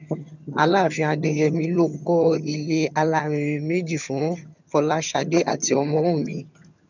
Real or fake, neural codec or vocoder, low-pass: fake; vocoder, 22.05 kHz, 80 mel bands, HiFi-GAN; 7.2 kHz